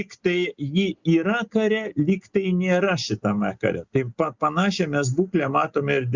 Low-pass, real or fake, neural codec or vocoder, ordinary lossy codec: 7.2 kHz; real; none; Opus, 64 kbps